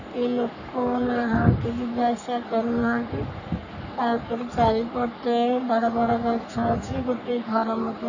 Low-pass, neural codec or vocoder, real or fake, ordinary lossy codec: 7.2 kHz; codec, 44.1 kHz, 3.4 kbps, Pupu-Codec; fake; none